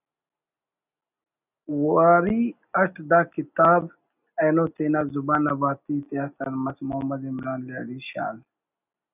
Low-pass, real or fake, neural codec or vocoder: 3.6 kHz; real; none